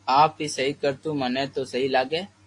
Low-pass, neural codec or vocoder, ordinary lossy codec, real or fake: 10.8 kHz; none; AAC, 48 kbps; real